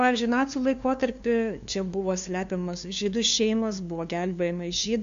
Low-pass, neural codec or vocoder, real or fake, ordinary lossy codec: 7.2 kHz; codec, 16 kHz, 2 kbps, FunCodec, trained on LibriTTS, 25 frames a second; fake; AAC, 64 kbps